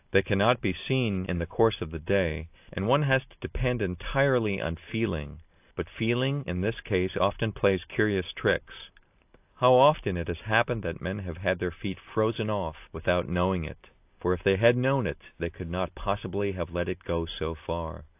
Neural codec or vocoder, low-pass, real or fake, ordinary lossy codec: none; 3.6 kHz; real; AAC, 32 kbps